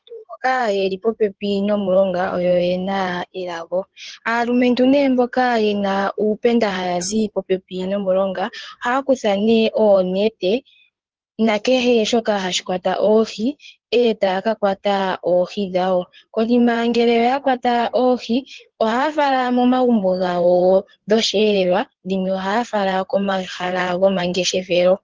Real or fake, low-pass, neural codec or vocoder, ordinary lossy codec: fake; 7.2 kHz; codec, 16 kHz in and 24 kHz out, 2.2 kbps, FireRedTTS-2 codec; Opus, 16 kbps